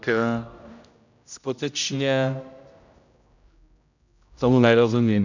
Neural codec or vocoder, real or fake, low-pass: codec, 16 kHz, 0.5 kbps, X-Codec, HuBERT features, trained on general audio; fake; 7.2 kHz